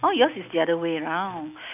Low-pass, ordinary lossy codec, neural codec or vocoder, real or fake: 3.6 kHz; none; none; real